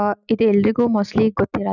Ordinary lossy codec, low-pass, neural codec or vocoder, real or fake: none; 7.2 kHz; none; real